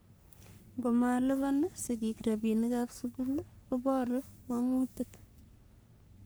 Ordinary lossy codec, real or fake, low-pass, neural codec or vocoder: none; fake; none; codec, 44.1 kHz, 3.4 kbps, Pupu-Codec